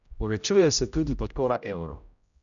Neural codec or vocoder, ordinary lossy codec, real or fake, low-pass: codec, 16 kHz, 0.5 kbps, X-Codec, HuBERT features, trained on general audio; none; fake; 7.2 kHz